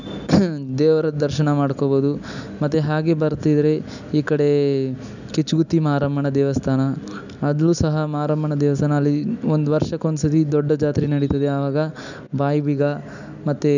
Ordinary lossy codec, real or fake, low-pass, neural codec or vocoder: none; real; 7.2 kHz; none